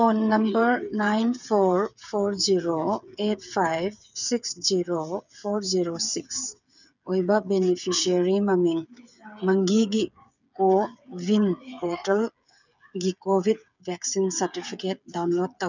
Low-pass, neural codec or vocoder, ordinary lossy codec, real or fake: 7.2 kHz; codec, 16 kHz, 8 kbps, FreqCodec, smaller model; none; fake